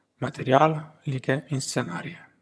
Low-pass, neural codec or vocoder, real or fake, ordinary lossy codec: none; vocoder, 22.05 kHz, 80 mel bands, HiFi-GAN; fake; none